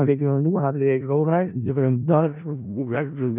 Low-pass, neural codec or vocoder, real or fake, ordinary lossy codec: 3.6 kHz; codec, 16 kHz in and 24 kHz out, 0.4 kbps, LongCat-Audio-Codec, four codebook decoder; fake; none